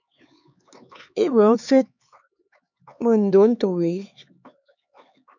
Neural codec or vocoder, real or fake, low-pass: codec, 16 kHz, 4 kbps, X-Codec, HuBERT features, trained on LibriSpeech; fake; 7.2 kHz